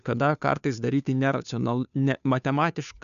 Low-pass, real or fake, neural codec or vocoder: 7.2 kHz; fake; codec, 16 kHz, 2 kbps, FunCodec, trained on Chinese and English, 25 frames a second